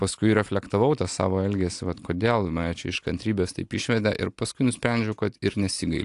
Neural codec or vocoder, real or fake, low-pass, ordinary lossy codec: none; real; 10.8 kHz; AAC, 64 kbps